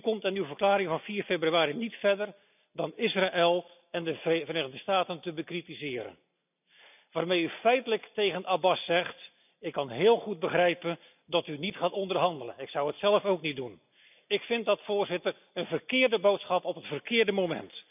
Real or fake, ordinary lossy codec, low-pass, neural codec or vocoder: real; none; 3.6 kHz; none